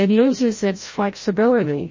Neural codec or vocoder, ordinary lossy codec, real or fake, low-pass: codec, 16 kHz, 0.5 kbps, FreqCodec, larger model; MP3, 32 kbps; fake; 7.2 kHz